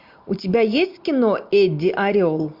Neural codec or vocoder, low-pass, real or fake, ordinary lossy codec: none; 5.4 kHz; real; MP3, 48 kbps